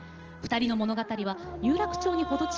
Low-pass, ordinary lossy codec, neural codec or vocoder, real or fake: 7.2 kHz; Opus, 16 kbps; none; real